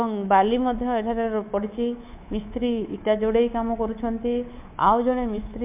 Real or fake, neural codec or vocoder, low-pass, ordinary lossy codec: fake; codec, 24 kHz, 3.1 kbps, DualCodec; 3.6 kHz; MP3, 32 kbps